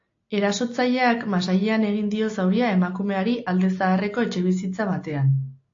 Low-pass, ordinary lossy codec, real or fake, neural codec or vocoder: 7.2 kHz; AAC, 48 kbps; real; none